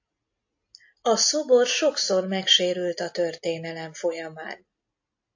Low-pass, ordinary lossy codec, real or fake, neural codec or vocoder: 7.2 kHz; AAC, 48 kbps; real; none